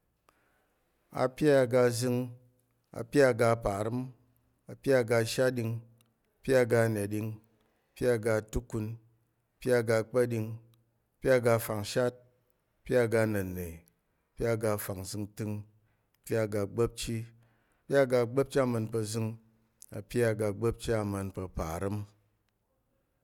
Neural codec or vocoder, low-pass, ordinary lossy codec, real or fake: none; 19.8 kHz; none; real